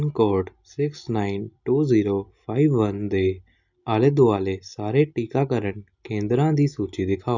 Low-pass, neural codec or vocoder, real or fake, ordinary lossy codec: 7.2 kHz; none; real; none